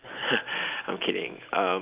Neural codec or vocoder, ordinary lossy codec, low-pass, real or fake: none; Opus, 24 kbps; 3.6 kHz; real